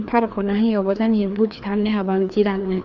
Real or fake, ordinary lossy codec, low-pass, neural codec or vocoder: fake; none; 7.2 kHz; codec, 16 kHz, 2 kbps, FreqCodec, larger model